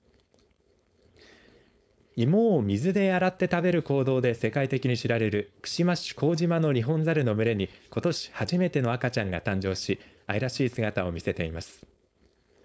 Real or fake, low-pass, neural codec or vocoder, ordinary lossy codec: fake; none; codec, 16 kHz, 4.8 kbps, FACodec; none